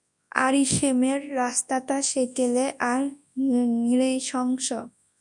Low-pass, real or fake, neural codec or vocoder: 10.8 kHz; fake; codec, 24 kHz, 0.9 kbps, WavTokenizer, large speech release